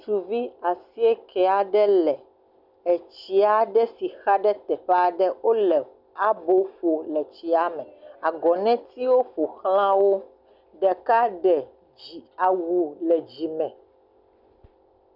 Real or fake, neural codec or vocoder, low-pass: real; none; 5.4 kHz